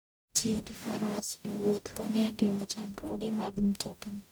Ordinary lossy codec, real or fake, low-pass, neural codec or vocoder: none; fake; none; codec, 44.1 kHz, 0.9 kbps, DAC